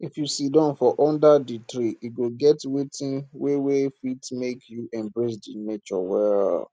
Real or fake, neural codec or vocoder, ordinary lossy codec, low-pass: real; none; none; none